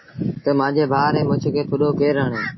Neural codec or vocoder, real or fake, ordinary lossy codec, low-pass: none; real; MP3, 24 kbps; 7.2 kHz